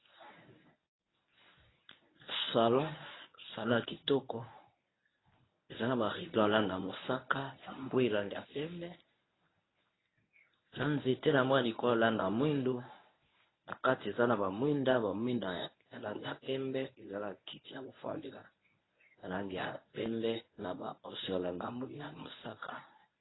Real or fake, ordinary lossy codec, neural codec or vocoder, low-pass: fake; AAC, 16 kbps; codec, 24 kHz, 0.9 kbps, WavTokenizer, medium speech release version 1; 7.2 kHz